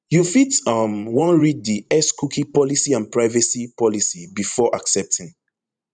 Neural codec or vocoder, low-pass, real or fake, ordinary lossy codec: vocoder, 44.1 kHz, 128 mel bands every 512 samples, BigVGAN v2; 9.9 kHz; fake; none